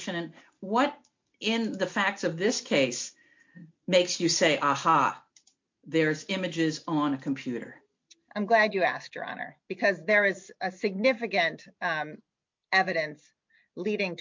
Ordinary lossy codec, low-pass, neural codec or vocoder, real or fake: MP3, 48 kbps; 7.2 kHz; none; real